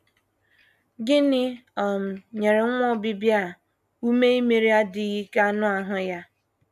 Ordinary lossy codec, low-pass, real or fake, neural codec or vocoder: none; 14.4 kHz; real; none